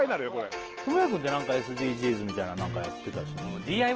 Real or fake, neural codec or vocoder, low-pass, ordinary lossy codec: real; none; 7.2 kHz; Opus, 16 kbps